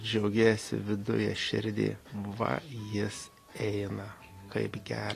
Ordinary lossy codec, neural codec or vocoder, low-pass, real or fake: AAC, 48 kbps; none; 14.4 kHz; real